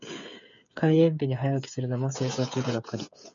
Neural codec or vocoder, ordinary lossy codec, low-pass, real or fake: codec, 16 kHz, 8 kbps, FreqCodec, smaller model; MP3, 64 kbps; 7.2 kHz; fake